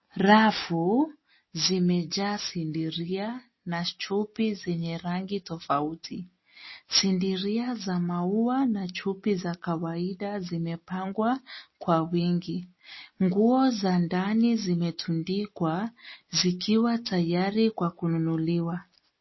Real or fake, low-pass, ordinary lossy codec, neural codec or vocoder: real; 7.2 kHz; MP3, 24 kbps; none